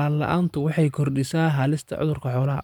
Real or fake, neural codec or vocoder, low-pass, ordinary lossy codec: fake; vocoder, 44.1 kHz, 128 mel bands every 512 samples, BigVGAN v2; 19.8 kHz; none